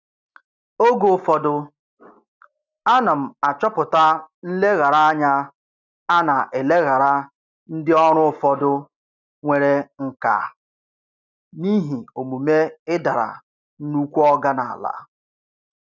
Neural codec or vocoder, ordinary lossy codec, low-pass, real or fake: none; none; 7.2 kHz; real